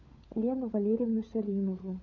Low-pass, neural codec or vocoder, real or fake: 7.2 kHz; codec, 16 kHz, 16 kbps, FunCodec, trained on LibriTTS, 50 frames a second; fake